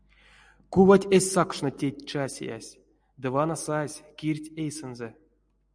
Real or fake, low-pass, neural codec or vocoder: real; 9.9 kHz; none